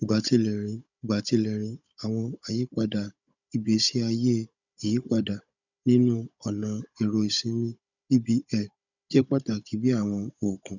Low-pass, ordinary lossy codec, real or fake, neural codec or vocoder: 7.2 kHz; none; fake; codec, 16 kHz, 16 kbps, FunCodec, trained on Chinese and English, 50 frames a second